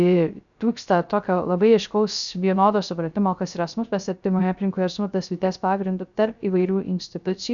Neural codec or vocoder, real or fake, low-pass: codec, 16 kHz, 0.3 kbps, FocalCodec; fake; 7.2 kHz